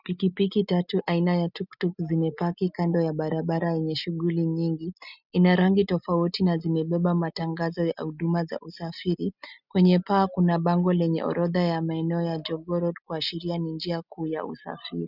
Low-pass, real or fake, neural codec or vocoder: 5.4 kHz; real; none